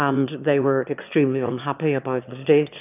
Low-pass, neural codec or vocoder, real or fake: 3.6 kHz; autoencoder, 22.05 kHz, a latent of 192 numbers a frame, VITS, trained on one speaker; fake